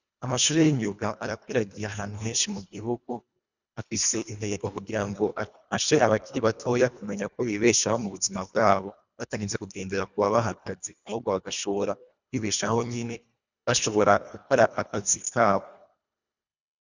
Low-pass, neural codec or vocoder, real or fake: 7.2 kHz; codec, 24 kHz, 1.5 kbps, HILCodec; fake